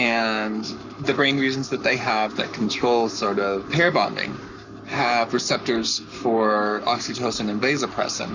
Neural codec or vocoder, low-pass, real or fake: codec, 44.1 kHz, 7.8 kbps, Pupu-Codec; 7.2 kHz; fake